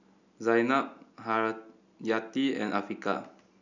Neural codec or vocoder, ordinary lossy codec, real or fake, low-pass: none; none; real; 7.2 kHz